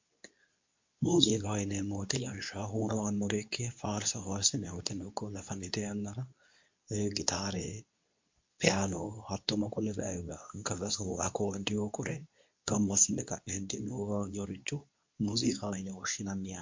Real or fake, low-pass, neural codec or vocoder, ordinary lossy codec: fake; 7.2 kHz; codec, 24 kHz, 0.9 kbps, WavTokenizer, medium speech release version 2; MP3, 48 kbps